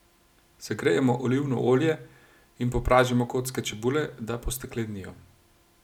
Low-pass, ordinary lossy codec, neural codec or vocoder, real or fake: 19.8 kHz; none; none; real